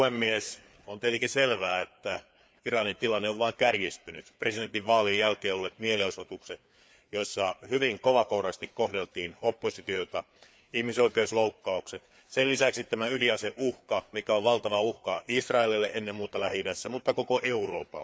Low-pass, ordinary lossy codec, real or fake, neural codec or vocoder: none; none; fake; codec, 16 kHz, 4 kbps, FreqCodec, larger model